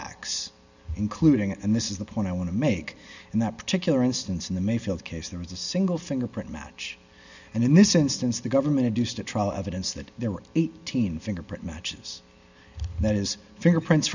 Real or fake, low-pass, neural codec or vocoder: real; 7.2 kHz; none